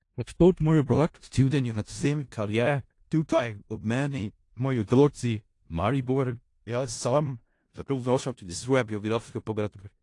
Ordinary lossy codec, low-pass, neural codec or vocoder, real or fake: AAC, 48 kbps; 10.8 kHz; codec, 16 kHz in and 24 kHz out, 0.4 kbps, LongCat-Audio-Codec, four codebook decoder; fake